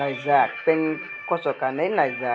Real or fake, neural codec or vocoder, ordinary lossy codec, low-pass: real; none; none; none